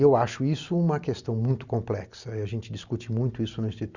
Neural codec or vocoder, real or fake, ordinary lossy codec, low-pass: none; real; Opus, 64 kbps; 7.2 kHz